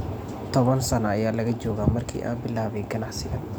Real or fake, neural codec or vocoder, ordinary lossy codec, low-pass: real; none; none; none